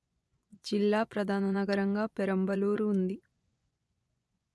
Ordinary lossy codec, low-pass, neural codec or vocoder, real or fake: none; none; vocoder, 24 kHz, 100 mel bands, Vocos; fake